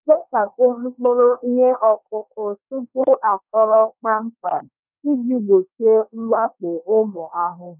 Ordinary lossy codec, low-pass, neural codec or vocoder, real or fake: none; 3.6 kHz; codec, 24 kHz, 1 kbps, SNAC; fake